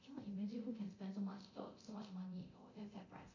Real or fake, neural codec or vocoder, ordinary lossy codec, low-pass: fake; codec, 24 kHz, 0.9 kbps, DualCodec; MP3, 64 kbps; 7.2 kHz